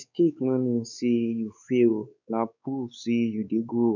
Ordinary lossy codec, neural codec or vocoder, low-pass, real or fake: none; codec, 16 kHz, 4 kbps, X-Codec, WavLM features, trained on Multilingual LibriSpeech; 7.2 kHz; fake